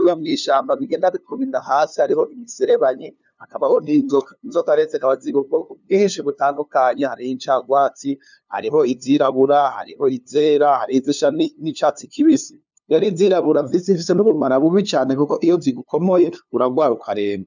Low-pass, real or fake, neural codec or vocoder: 7.2 kHz; fake; codec, 16 kHz, 2 kbps, FunCodec, trained on LibriTTS, 25 frames a second